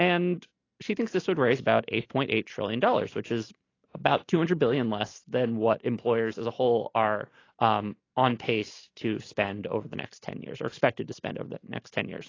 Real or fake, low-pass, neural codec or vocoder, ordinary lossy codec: real; 7.2 kHz; none; AAC, 32 kbps